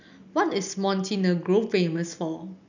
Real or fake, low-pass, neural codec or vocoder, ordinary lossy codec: real; 7.2 kHz; none; none